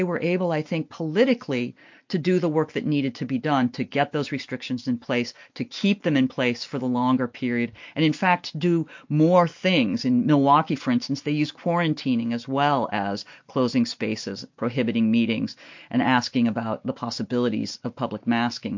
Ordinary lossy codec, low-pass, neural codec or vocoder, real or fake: MP3, 48 kbps; 7.2 kHz; none; real